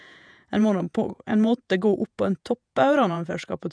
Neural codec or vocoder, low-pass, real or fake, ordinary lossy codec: vocoder, 48 kHz, 128 mel bands, Vocos; 9.9 kHz; fake; none